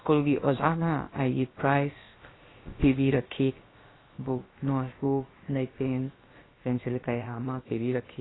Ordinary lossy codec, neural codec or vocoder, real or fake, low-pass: AAC, 16 kbps; codec, 16 kHz, about 1 kbps, DyCAST, with the encoder's durations; fake; 7.2 kHz